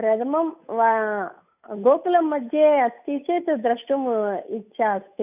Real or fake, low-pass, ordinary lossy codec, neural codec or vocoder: fake; 3.6 kHz; none; codec, 16 kHz, 8 kbps, FunCodec, trained on Chinese and English, 25 frames a second